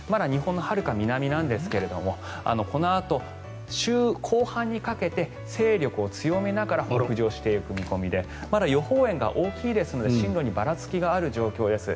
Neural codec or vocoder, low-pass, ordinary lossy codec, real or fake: none; none; none; real